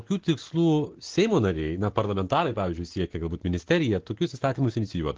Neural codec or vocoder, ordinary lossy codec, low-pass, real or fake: none; Opus, 16 kbps; 7.2 kHz; real